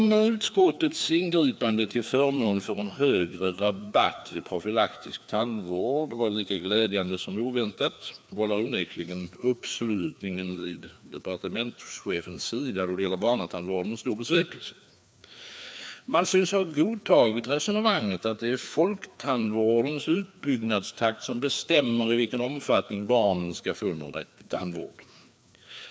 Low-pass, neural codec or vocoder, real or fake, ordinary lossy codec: none; codec, 16 kHz, 2 kbps, FreqCodec, larger model; fake; none